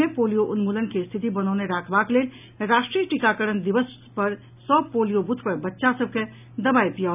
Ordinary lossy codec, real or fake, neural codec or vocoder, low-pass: none; real; none; 3.6 kHz